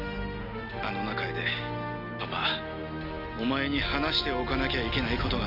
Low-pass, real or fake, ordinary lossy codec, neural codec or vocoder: 5.4 kHz; real; none; none